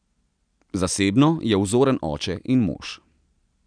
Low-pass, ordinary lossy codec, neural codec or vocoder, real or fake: 9.9 kHz; none; none; real